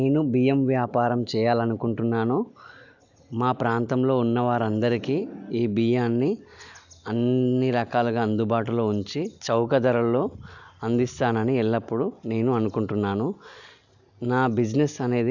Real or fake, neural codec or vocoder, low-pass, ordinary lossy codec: real; none; 7.2 kHz; none